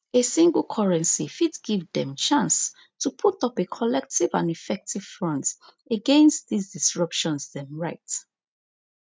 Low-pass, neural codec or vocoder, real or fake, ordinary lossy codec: none; none; real; none